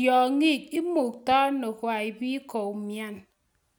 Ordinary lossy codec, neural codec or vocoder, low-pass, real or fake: none; none; none; real